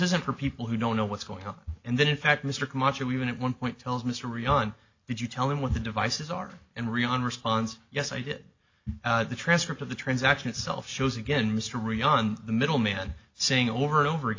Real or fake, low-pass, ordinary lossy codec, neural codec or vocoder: real; 7.2 kHz; AAC, 48 kbps; none